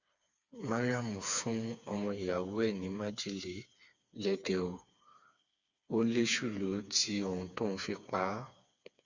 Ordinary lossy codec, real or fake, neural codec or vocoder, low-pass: Opus, 64 kbps; fake; codec, 16 kHz, 4 kbps, FreqCodec, smaller model; 7.2 kHz